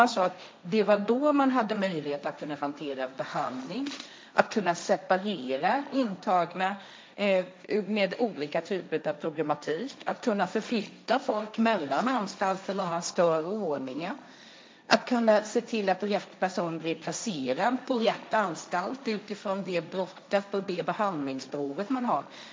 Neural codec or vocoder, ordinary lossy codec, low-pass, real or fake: codec, 16 kHz, 1.1 kbps, Voila-Tokenizer; none; none; fake